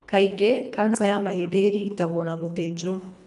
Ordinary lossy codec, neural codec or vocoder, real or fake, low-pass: none; codec, 24 kHz, 1.5 kbps, HILCodec; fake; 10.8 kHz